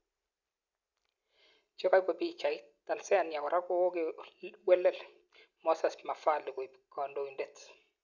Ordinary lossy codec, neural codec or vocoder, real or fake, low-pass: none; none; real; 7.2 kHz